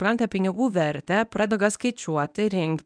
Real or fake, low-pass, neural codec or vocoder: fake; 9.9 kHz; codec, 24 kHz, 0.9 kbps, WavTokenizer, medium speech release version 1